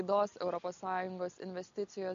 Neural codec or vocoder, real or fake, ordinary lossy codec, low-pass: none; real; AAC, 64 kbps; 7.2 kHz